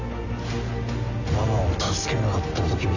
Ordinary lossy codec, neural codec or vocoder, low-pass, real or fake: none; none; 7.2 kHz; real